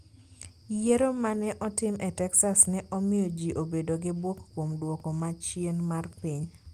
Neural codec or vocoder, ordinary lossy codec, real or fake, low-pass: none; Opus, 32 kbps; real; 14.4 kHz